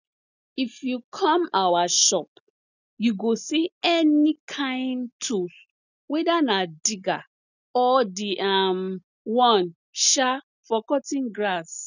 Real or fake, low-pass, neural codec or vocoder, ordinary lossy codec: real; 7.2 kHz; none; none